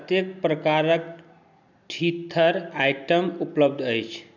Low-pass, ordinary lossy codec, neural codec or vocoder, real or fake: 7.2 kHz; none; none; real